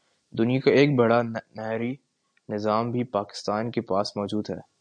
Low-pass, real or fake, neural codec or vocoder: 9.9 kHz; real; none